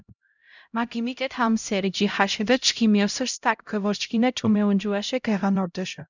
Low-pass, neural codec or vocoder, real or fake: 7.2 kHz; codec, 16 kHz, 0.5 kbps, X-Codec, HuBERT features, trained on LibriSpeech; fake